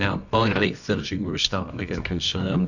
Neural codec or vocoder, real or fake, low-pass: codec, 24 kHz, 0.9 kbps, WavTokenizer, medium music audio release; fake; 7.2 kHz